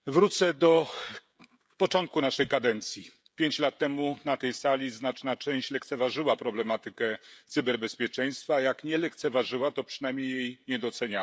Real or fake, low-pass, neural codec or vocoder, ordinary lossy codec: fake; none; codec, 16 kHz, 16 kbps, FreqCodec, smaller model; none